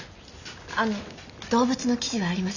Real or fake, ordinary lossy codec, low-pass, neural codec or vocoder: real; none; 7.2 kHz; none